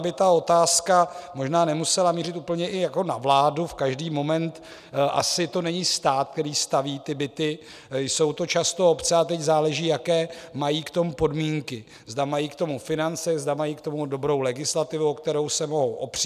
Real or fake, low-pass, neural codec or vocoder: real; 14.4 kHz; none